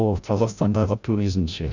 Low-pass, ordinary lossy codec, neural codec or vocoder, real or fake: 7.2 kHz; none; codec, 16 kHz, 0.5 kbps, FreqCodec, larger model; fake